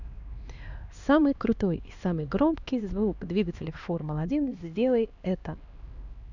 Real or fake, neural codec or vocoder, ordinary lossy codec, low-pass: fake; codec, 16 kHz, 2 kbps, X-Codec, HuBERT features, trained on LibriSpeech; none; 7.2 kHz